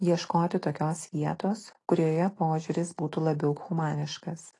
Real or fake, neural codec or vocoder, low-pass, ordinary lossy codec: real; none; 10.8 kHz; AAC, 32 kbps